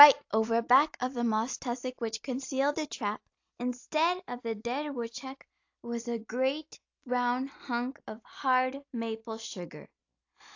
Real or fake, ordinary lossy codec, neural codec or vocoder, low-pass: real; AAC, 48 kbps; none; 7.2 kHz